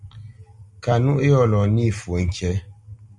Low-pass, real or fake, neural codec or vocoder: 10.8 kHz; real; none